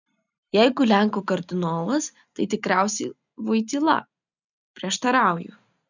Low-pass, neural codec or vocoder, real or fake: 7.2 kHz; none; real